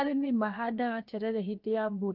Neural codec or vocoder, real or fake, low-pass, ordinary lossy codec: codec, 16 kHz, 0.7 kbps, FocalCodec; fake; 5.4 kHz; Opus, 32 kbps